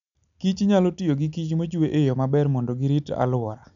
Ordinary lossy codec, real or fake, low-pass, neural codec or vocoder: none; real; 7.2 kHz; none